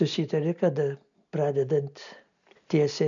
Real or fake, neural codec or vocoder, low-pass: real; none; 7.2 kHz